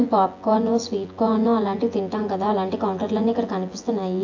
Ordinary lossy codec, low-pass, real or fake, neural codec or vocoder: AAC, 48 kbps; 7.2 kHz; fake; vocoder, 24 kHz, 100 mel bands, Vocos